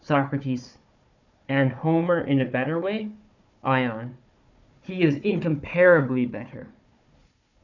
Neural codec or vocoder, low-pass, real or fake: codec, 16 kHz, 4 kbps, FunCodec, trained on Chinese and English, 50 frames a second; 7.2 kHz; fake